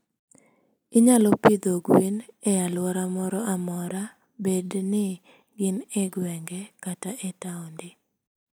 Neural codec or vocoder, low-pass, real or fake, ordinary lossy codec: none; none; real; none